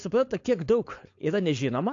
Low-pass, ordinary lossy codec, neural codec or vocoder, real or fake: 7.2 kHz; AAC, 48 kbps; codec, 16 kHz, 4.8 kbps, FACodec; fake